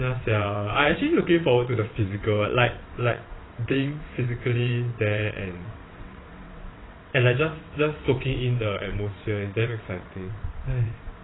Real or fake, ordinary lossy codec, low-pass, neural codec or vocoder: fake; AAC, 16 kbps; 7.2 kHz; vocoder, 22.05 kHz, 80 mel bands, WaveNeXt